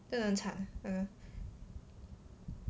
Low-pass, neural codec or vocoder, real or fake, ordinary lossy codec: none; none; real; none